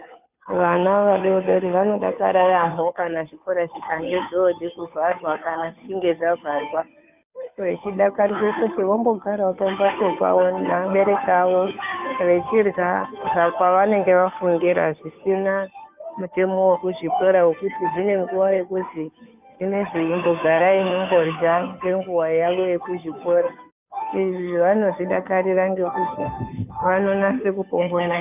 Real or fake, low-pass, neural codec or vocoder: fake; 3.6 kHz; codec, 16 kHz, 2 kbps, FunCodec, trained on Chinese and English, 25 frames a second